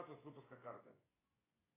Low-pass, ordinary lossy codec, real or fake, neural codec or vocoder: 3.6 kHz; AAC, 16 kbps; fake; vocoder, 24 kHz, 100 mel bands, Vocos